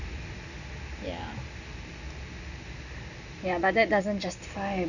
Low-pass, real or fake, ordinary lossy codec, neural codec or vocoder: 7.2 kHz; real; none; none